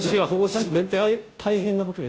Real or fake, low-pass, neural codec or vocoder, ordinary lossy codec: fake; none; codec, 16 kHz, 0.5 kbps, FunCodec, trained on Chinese and English, 25 frames a second; none